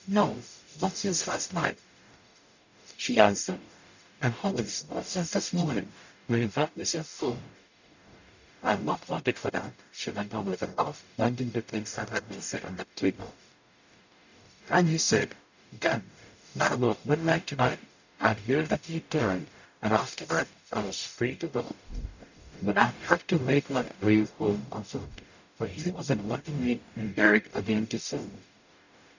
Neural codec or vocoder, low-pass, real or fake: codec, 44.1 kHz, 0.9 kbps, DAC; 7.2 kHz; fake